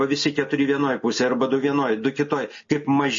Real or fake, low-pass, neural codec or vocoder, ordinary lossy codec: real; 7.2 kHz; none; MP3, 32 kbps